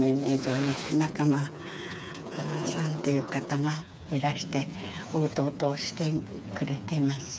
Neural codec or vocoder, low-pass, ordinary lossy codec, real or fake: codec, 16 kHz, 4 kbps, FreqCodec, smaller model; none; none; fake